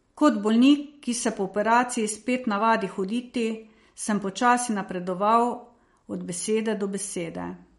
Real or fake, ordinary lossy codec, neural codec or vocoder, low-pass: real; MP3, 48 kbps; none; 19.8 kHz